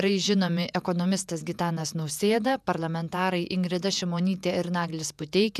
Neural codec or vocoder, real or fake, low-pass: vocoder, 48 kHz, 128 mel bands, Vocos; fake; 14.4 kHz